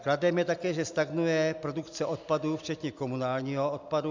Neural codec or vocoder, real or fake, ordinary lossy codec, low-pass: none; real; MP3, 64 kbps; 7.2 kHz